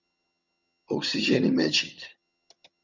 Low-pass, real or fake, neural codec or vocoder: 7.2 kHz; fake; vocoder, 22.05 kHz, 80 mel bands, HiFi-GAN